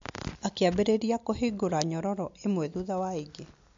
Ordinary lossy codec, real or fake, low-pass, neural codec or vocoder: MP3, 48 kbps; real; 7.2 kHz; none